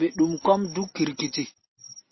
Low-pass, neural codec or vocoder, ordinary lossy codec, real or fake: 7.2 kHz; none; MP3, 24 kbps; real